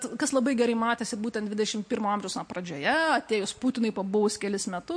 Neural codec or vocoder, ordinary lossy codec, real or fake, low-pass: none; MP3, 48 kbps; real; 9.9 kHz